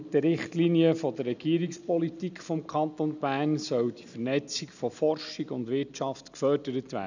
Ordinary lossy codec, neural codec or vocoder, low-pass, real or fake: none; none; 7.2 kHz; real